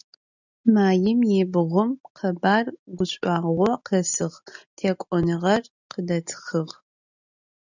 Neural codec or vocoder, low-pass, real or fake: none; 7.2 kHz; real